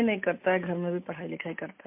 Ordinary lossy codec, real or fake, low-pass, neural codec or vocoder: MP3, 32 kbps; real; 3.6 kHz; none